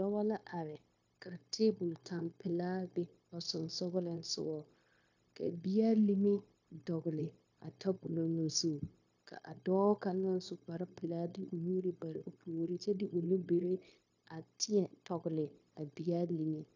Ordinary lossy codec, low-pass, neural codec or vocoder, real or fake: AAC, 48 kbps; 7.2 kHz; codec, 16 kHz, 0.9 kbps, LongCat-Audio-Codec; fake